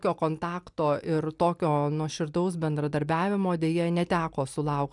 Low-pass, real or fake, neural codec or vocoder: 10.8 kHz; real; none